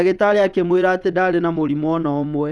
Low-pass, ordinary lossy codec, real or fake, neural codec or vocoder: none; none; fake; vocoder, 22.05 kHz, 80 mel bands, WaveNeXt